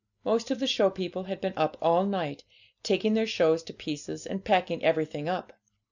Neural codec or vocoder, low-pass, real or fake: none; 7.2 kHz; real